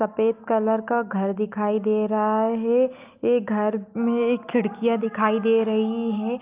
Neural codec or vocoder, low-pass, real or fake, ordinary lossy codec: none; 3.6 kHz; real; Opus, 24 kbps